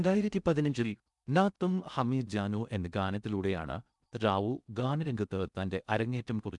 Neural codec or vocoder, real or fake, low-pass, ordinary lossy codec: codec, 16 kHz in and 24 kHz out, 0.6 kbps, FocalCodec, streaming, 2048 codes; fake; 10.8 kHz; none